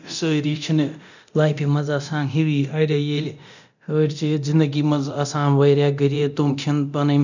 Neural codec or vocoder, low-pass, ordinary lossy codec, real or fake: codec, 24 kHz, 0.9 kbps, DualCodec; 7.2 kHz; none; fake